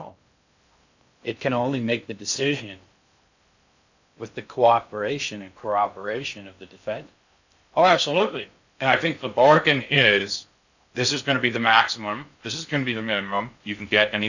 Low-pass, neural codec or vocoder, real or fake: 7.2 kHz; codec, 16 kHz in and 24 kHz out, 0.6 kbps, FocalCodec, streaming, 2048 codes; fake